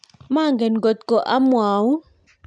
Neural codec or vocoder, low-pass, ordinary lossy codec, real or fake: none; 9.9 kHz; none; real